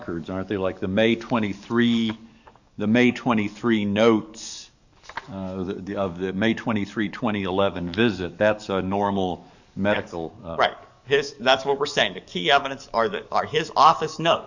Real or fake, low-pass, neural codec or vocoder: fake; 7.2 kHz; codec, 44.1 kHz, 7.8 kbps, DAC